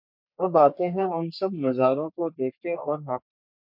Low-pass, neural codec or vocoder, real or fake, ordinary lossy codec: 5.4 kHz; codec, 44.1 kHz, 3.4 kbps, Pupu-Codec; fake; MP3, 48 kbps